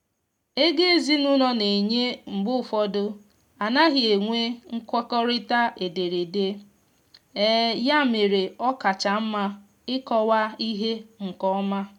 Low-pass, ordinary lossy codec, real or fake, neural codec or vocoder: 19.8 kHz; none; real; none